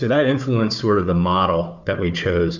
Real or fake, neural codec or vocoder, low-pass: fake; codec, 16 kHz, 4 kbps, FunCodec, trained on Chinese and English, 50 frames a second; 7.2 kHz